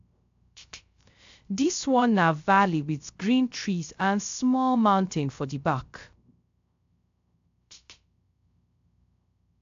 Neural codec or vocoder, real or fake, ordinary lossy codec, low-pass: codec, 16 kHz, 0.3 kbps, FocalCodec; fake; AAC, 64 kbps; 7.2 kHz